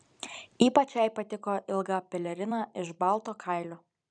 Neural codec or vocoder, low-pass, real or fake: vocoder, 44.1 kHz, 128 mel bands every 256 samples, BigVGAN v2; 9.9 kHz; fake